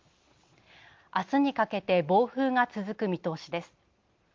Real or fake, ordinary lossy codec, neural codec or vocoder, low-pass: real; Opus, 24 kbps; none; 7.2 kHz